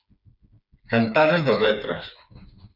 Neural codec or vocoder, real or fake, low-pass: codec, 16 kHz, 4 kbps, FreqCodec, smaller model; fake; 5.4 kHz